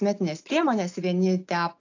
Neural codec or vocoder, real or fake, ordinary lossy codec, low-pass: none; real; AAC, 48 kbps; 7.2 kHz